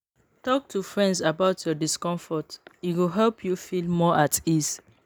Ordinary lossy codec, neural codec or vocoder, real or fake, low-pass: none; none; real; none